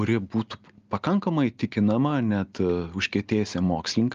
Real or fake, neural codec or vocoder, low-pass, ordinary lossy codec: real; none; 7.2 kHz; Opus, 32 kbps